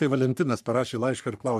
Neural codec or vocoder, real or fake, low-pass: codec, 44.1 kHz, 3.4 kbps, Pupu-Codec; fake; 14.4 kHz